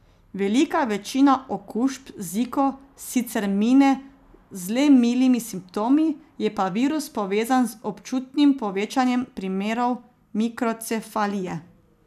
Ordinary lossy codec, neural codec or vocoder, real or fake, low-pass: none; none; real; 14.4 kHz